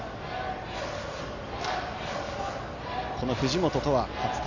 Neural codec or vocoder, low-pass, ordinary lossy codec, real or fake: none; 7.2 kHz; none; real